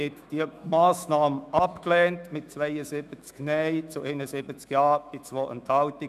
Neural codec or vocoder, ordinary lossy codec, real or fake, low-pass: autoencoder, 48 kHz, 128 numbers a frame, DAC-VAE, trained on Japanese speech; none; fake; 14.4 kHz